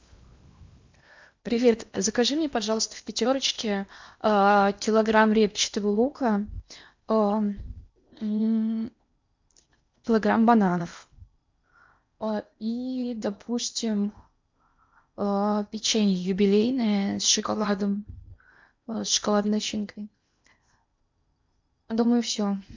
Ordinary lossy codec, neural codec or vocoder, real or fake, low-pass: MP3, 64 kbps; codec, 16 kHz in and 24 kHz out, 0.8 kbps, FocalCodec, streaming, 65536 codes; fake; 7.2 kHz